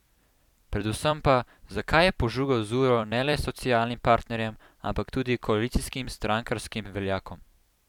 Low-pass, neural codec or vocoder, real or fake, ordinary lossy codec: 19.8 kHz; vocoder, 44.1 kHz, 128 mel bands every 256 samples, BigVGAN v2; fake; none